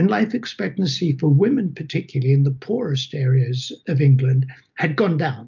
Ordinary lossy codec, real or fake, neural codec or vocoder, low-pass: MP3, 64 kbps; real; none; 7.2 kHz